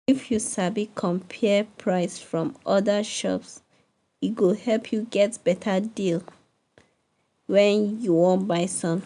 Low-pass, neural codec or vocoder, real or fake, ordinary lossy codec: 10.8 kHz; none; real; MP3, 96 kbps